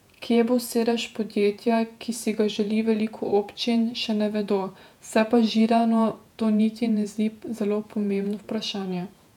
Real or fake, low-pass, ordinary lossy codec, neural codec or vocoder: fake; 19.8 kHz; none; vocoder, 48 kHz, 128 mel bands, Vocos